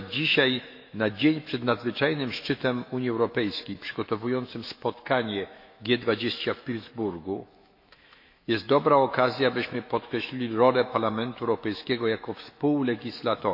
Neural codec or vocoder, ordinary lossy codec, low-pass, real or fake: autoencoder, 48 kHz, 128 numbers a frame, DAC-VAE, trained on Japanese speech; MP3, 32 kbps; 5.4 kHz; fake